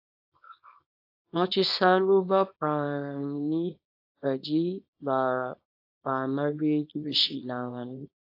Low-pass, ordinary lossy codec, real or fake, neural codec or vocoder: 5.4 kHz; AAC, 32 kbps; fake; codec, 24 kHz, 0.9 kbps, WavTokenizer, small release